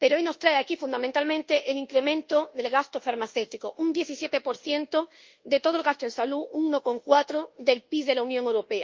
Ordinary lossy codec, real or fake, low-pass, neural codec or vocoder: Opus, 24 kbps; fake; 7.2 kHz; codec, 24 kHz, 1.2 kbps, DualCodec